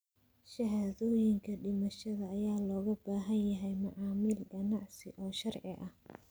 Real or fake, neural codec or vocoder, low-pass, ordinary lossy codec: real; none; none; none